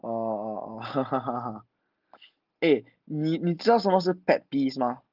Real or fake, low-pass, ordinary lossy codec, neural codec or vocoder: real; 5.4 kHz; Opus, 32 kbps; none